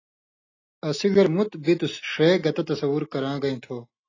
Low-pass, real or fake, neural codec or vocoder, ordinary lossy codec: 7.2 kHz; real; none; AAC, 32 kbps